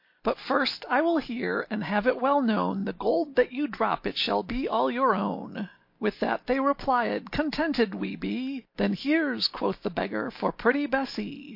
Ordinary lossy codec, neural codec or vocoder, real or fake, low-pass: MP3, 32 kbps; none; real; 5.4 kHz